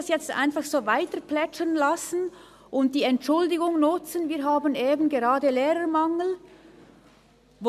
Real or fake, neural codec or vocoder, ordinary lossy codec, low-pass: real; none; MP3, 96 kbps; 14.4 kHz